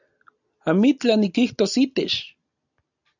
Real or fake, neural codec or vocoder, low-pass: real; none; 7.2 kHz